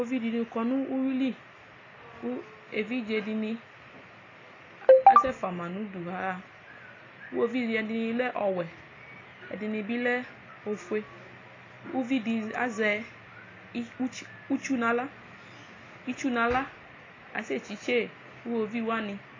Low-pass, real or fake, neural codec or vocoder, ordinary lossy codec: 7.2 kHz; real; none; AAC, 32 kbps